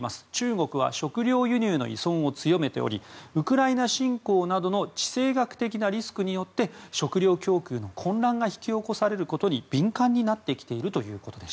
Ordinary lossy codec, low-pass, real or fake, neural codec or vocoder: none; none; real; none